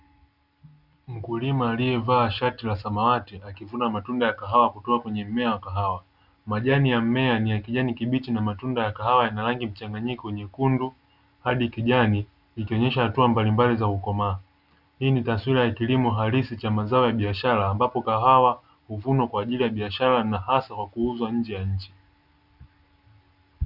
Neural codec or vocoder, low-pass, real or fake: none; 5.4 kHz; real